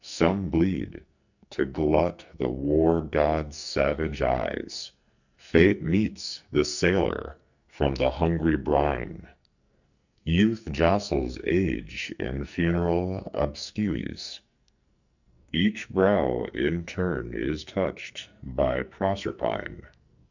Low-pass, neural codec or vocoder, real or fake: 7.2 kHz; codec, 44.1 kHz, 2.6 kbps, SNAC; fake